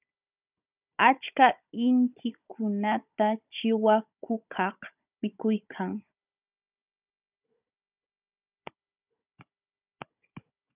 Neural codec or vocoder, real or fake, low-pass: codec, 16 kHz, 16 kbps, FunCodec, trained on Chinese and English, 50 frames a second; fake; 3.6 kHz